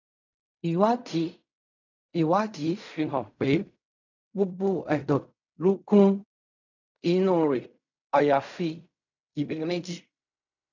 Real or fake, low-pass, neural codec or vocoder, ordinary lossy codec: fake; 7.2 kHz; codec, 16 kHz in and 24 kHz out, 0.4 kbps, LongCat-Audio-Codec, fine tuned four codebook decoder; none